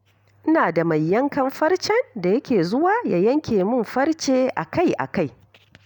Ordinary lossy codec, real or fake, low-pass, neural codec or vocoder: none; real; 19.8 kHz; none